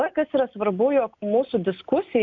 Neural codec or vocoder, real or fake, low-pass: none; real; 7.2 kHz